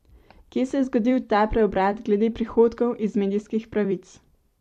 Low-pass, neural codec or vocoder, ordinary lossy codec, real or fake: 14.4 kHz; vocoder, 44.1 kHz, 128 mel bands every 256 samples, BigVGAN v2; MP3, 64 kbps; fake